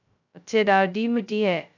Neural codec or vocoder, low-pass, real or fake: codec, 16 kHz, 0.2 kbps, FocalCodec; 7.2 kHz; fake